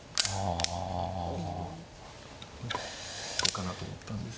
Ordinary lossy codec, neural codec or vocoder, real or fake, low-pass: none; none; real; none